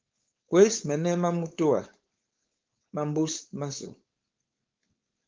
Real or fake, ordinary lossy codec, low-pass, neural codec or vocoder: fake; Opus, 32 kbps; 7.2 kHz; codec, 16 kHz, 4.8 kbps, FACodec